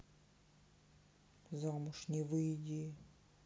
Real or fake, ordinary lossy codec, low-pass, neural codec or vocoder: real; none; none; none